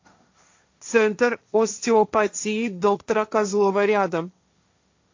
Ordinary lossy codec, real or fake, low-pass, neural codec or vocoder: AAC, 48 kbps; fake; 7.2 kHz; codec, 16 kHz, 1.1 kbps, Voila-Tokenizer